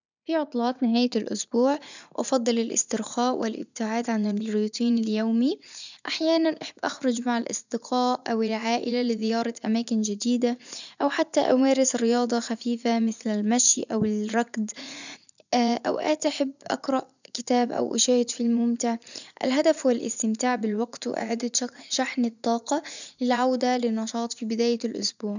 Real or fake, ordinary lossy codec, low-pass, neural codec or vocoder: fake; none; 7.2 kHz; vocoder, 44.1 kHz, 80 mel bands, Vocos